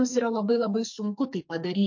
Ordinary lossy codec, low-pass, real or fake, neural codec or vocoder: MP3, 48 kbps; 7.2 kHz; fake; codec, 44.1 kHz, 2.6 kbps, SNAC